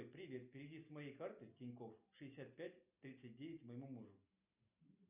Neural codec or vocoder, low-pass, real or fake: none; 3.6 kHz; real